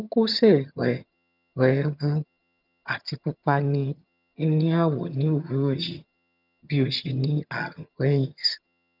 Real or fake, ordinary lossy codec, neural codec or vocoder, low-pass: fake; none; vocoder, 22.05 kHz, 80 mel bands, HiFi-GAN; 5.4 kHz